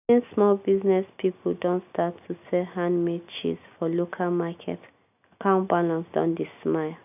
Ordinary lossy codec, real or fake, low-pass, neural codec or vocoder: none; real; 3.6 kHz; none